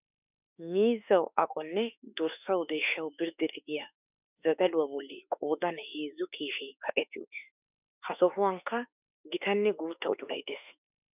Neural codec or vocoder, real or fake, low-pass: autoencoder, 48 kHz, 32 numbers a frame, DAC-VAE, trained on Japanese speech; fake; 3.6 kHz